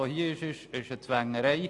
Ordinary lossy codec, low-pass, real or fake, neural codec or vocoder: AAC, 48 kbps; 10.8 kHz; real; none